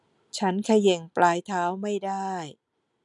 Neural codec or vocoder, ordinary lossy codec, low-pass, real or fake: none; none; 10.8 kHz; real